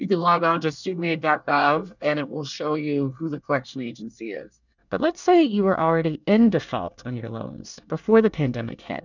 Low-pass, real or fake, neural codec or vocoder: 7.2 kHz; fake; codec, 24 kHz, 1 kbps, SNAC